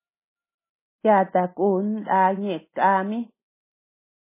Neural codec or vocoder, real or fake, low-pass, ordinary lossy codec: none; real; 3.6 kHz; MP3, 16 kbps